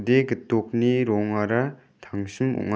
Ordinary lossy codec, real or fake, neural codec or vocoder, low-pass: none; real; none; none